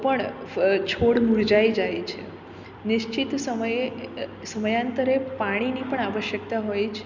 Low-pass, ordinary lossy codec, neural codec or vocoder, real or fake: 7.2 kHz; none; none; real